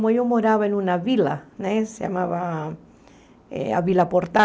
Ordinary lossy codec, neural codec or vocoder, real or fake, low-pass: none; none; real; none